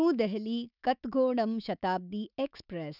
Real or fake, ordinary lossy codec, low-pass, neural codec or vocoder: fake; none; 5.4 kHz; autoencoder, 48 kHz, 128 numbers a frame, DAC-VAE, trained on Japanese speech